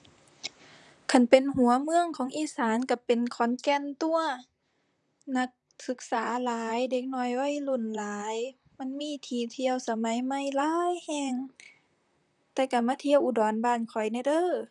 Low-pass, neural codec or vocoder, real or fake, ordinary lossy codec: 10.8 kHz; vocoder, 44.1 kHz, 128 mel bands, Pupu-Vocoder; fake; none